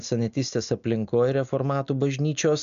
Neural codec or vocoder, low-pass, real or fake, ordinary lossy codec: none; 7.2 kHz; real; MP3, 96 kbps